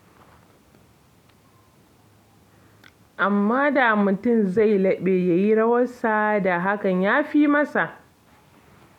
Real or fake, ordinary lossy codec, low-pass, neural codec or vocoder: real; none; 19.8 kHz; none